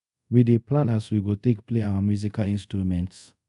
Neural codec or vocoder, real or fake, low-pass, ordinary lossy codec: codec, 24 kHz, 0.5 kbps, DualCodec; fake; 10.8 kHz; none